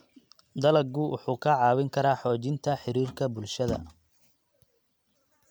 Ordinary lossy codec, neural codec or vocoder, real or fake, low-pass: none; none; real; none